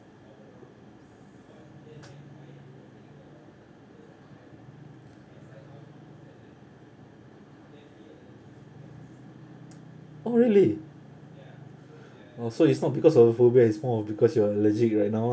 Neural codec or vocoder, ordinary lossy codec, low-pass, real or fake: none; none; none; real